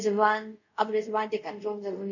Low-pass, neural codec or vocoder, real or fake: 7.2 kHz; codec, 24 kHz, 0.5 kbps, DualCodec; fake